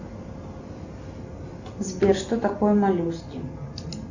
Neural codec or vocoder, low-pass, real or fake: none; 7.2 kHz; real